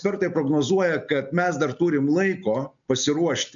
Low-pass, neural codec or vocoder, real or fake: 7.2 kHz; none; real